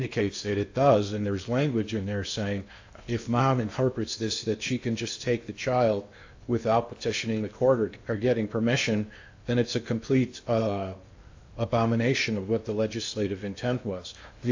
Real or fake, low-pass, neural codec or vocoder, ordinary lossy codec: fake; 7.2 kHz; codec, 16 kHz in and 24 kHz out, 0.8 kbps, FocalCodec, streaming, 65536 codes; AAC, 48 kbps